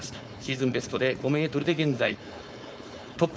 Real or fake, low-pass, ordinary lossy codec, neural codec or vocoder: fake; none; none; codec, 16 kHz, 4.8 kbps, FACodec